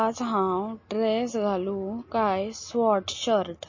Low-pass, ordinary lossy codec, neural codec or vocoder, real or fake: 7.2 kHz; MP3, 32 kbps; none; real